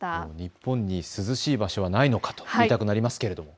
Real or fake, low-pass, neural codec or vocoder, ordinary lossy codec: real; none; none; none